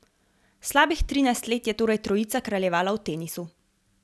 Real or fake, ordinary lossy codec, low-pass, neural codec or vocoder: real; none; none; none